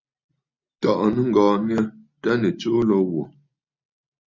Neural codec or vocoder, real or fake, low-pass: none; real; 7.2 kHz